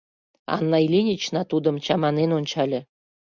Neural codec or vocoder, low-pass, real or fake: none; 7.2 kHz; real